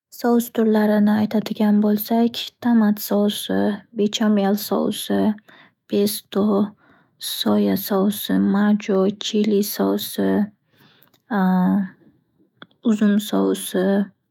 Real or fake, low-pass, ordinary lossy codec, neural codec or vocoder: fake; 19.8 kHz; none; autoencoder, 48 kHz, 128 numbers a frame, DAC-VAE, trained on Japanese speech